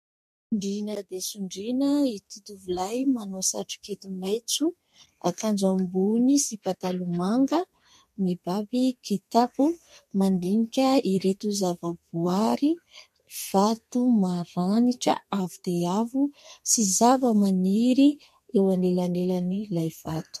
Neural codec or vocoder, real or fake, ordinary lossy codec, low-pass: codec, 32 kHz, 1.9 kbps, SNAC; fake; MP3, 64 kbps; 14.4 kHz